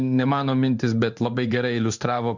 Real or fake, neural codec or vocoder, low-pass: fake; codec, 16 kHz in and 24 kHz out, 1 kbps, XY-Tokenizer; 7.2 kHz